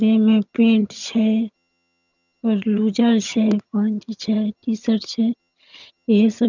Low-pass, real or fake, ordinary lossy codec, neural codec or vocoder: 7.2 kHz; fake; none; vocoder, 22.05 kHz, 80 mel bands, HiFi-GAN